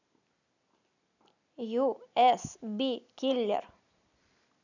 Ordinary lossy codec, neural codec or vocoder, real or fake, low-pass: none; none; real; 7.2 kHz